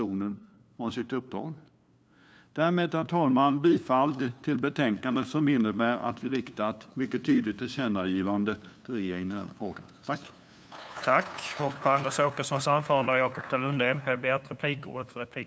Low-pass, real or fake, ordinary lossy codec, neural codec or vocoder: none; fake; none; codec, 16 kHz, 2 kbps, FunCodec, trained on LibriTTS, 25 frames a second